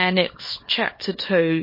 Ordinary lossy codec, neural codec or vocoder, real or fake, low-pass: MP3, 24 kbps; codec, 24 kHz, 0.9 kbps, WavTokenizer, small release; fake; 5.4 kHz